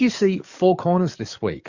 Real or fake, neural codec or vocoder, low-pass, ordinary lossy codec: fake; codec, 44.1 kHz, 7.8 kbps, DAC; 7.2 kHz; Opus, 64 kbps